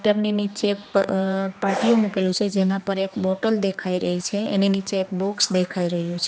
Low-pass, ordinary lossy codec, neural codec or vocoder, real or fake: none; none; codec, 16 kHz, 2 kbps, X-Codec, HuBERT features, trained on general audio; fake